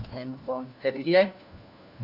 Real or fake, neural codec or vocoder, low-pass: fake; codec, 16 kHz, 1 kbps, FunCodec, trained on LibriTTS, 50 frames a second; 5.4 kHz